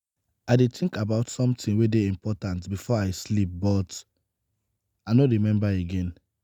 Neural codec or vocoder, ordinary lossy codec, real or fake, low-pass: none; none; real; 19.8 kHz